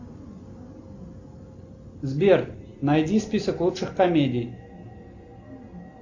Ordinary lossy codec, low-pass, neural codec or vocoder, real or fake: AAC, 48 kbps; 7.2 kHz; none; real